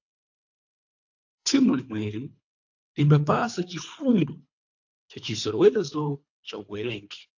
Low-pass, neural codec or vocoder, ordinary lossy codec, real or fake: 7.2 kHz; codec, 24 kHz, 3 kbps, HILCodec; AAC, 48 kbps; fake